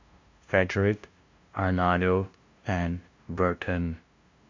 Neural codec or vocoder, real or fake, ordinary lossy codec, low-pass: codec, 16 kHz, 0.5 kbps, FunCodec, trained on LibriTTS, 25 frames a second; fake; MP3, 48 kbps; 7.2 kHz